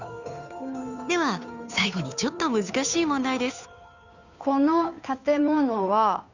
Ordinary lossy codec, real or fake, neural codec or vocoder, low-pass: none; fake; codec, 16 kHz, 2 kbps, FunCodec, trained on Chinese and English, 25 frames a second; 7.2 kHz